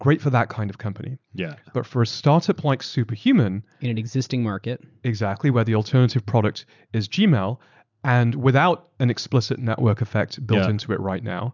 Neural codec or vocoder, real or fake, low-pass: none; real; 7.2 kHz